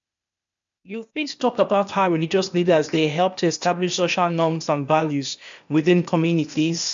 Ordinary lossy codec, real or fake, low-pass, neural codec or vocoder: MP3, 64 kbps; fake; 7.2 kHz; codec, 16 kHz, 0.8 kbps, ZipCodec